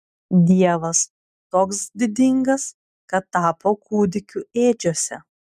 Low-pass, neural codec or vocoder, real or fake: 14.4 kHz; none; real